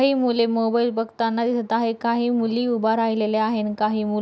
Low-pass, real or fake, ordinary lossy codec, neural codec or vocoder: none; real; none; none